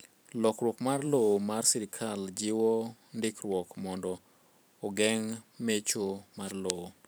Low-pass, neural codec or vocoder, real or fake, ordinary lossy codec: none; vocoder, 44.1 kHz, 128 mel bands every 512 samples, BigVGAN v2; fake; none